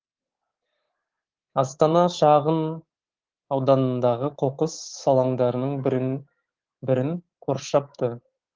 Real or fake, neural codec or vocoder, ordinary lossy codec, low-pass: fake; codec, 24 kHz, 3.1 kbps, DualCodec; Opus, 16 kbps; 7.2 kHz